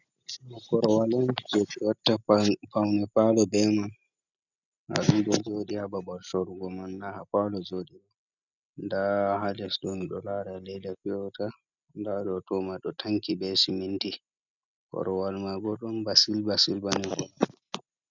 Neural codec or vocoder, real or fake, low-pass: none; real; 7.2 kHz